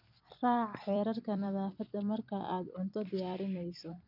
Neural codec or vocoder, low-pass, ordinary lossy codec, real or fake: vocoder, 44.1 kHz, 128 mel bands every 256 samples, BigVGAN v2; 5.4 kHz; none; fake